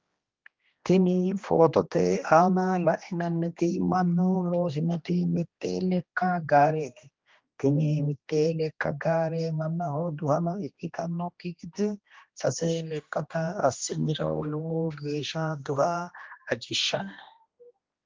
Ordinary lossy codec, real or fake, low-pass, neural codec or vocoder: Opus, 32 kbps; fake; 7.2 kHz; codec, 16 kHz, 1 kbps, X-Codec, HuBERT features, trained on general audio